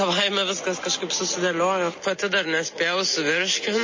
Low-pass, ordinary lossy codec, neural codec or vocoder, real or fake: 7.2 kHz; MP3, 32 kbps; none; real